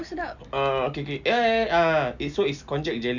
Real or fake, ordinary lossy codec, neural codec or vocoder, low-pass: real; none; none; 7.2 kHz